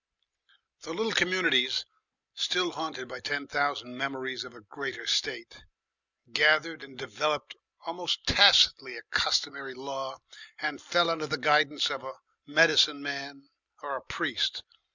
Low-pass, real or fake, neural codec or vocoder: 7.2 kHz; real; none